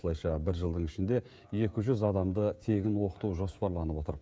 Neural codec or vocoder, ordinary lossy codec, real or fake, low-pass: codec, 16 kHz, 16 kbps, FreqCodec, smaller model; none; fake; none